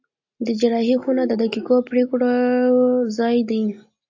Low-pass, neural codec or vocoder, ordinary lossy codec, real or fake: 7.2 kHz; none; AAC, 48 kbps; real